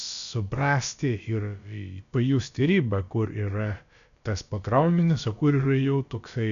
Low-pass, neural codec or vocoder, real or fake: 7.2 kHz; codec, 16 kHz, about 1 kbps, DyCAST, with the encoder's durations; fake